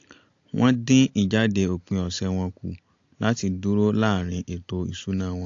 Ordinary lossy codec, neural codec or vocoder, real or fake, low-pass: AAC, 48 kbps; none; real; 7.2 kHz